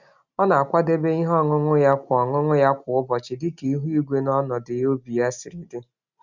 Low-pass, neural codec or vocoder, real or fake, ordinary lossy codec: 7.2 kHz; none; real; none